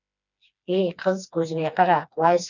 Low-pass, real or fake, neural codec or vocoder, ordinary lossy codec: 7.2 kHz; fake; codec, 16 kHz, 2 kbps, FreqCodec, smaller model; none